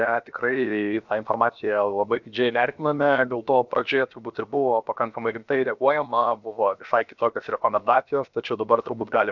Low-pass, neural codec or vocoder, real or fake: 7.2 kHz; codec, 16 kHz, 0.7 kbps, FocalCodec; fake